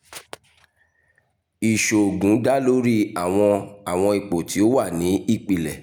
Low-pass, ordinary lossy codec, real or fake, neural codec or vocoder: none; none; real; none